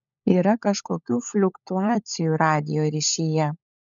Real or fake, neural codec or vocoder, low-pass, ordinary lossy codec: fake; codec, 16 kHz, 16 kbps, FunCodec, trained on LibriTTS, 50 frames a second; 7.2 kHz; MP3, 96 kbps